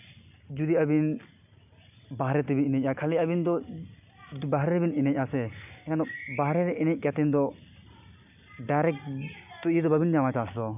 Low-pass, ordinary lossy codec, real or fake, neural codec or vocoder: 3.6 kHz; none; real; none